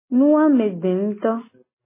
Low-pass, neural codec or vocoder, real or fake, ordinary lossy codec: 3.6 kHz; none; real; MP3, 16 kbps